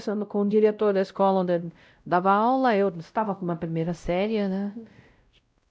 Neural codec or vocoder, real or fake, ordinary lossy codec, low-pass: codec, 16 kHz, 0.5 kbps, X-Codec, WavLM features, trained on Multilingual LibriSpeech; fake; none; none